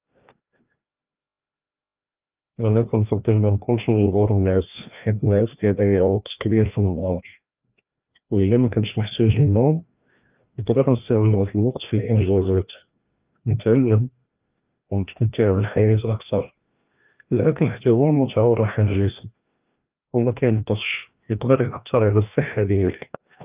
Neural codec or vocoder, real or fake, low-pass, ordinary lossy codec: codec, 16 kHz, 1 kbps, FreqCodec, larger model; fake; 3.6 kHz; Opus, 24 kbps